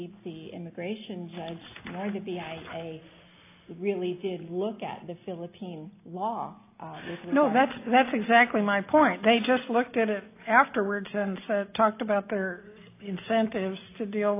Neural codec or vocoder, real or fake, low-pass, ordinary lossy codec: none; real; 3.6 kHz; AAC, 24 kbps